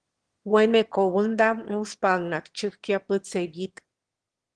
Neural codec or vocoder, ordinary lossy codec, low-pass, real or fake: autoencoder, 22.05 kHz, a latent of 192 numbers a frame, VITS, trained on one speaker; Opus, 16 kbps; 9.9 kHz; fake